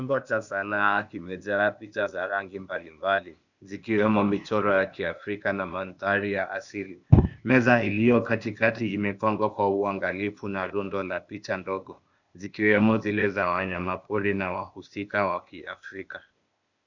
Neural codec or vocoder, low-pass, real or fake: codec, 16 kHz, 0.8 kbps, ZipCodec; 7.2 kHz; fake